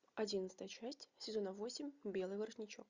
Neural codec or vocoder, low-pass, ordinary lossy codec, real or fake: none; 7.2 kHz; Opus, 64 kbps; real